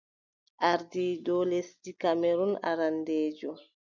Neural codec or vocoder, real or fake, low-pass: none; real; 7.2 kHz